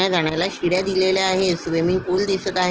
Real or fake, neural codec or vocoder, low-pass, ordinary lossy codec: real; none; 7.2 kHz; Opus, 16 kbps